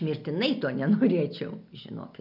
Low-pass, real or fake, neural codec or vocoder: 5.4 kHz; real; none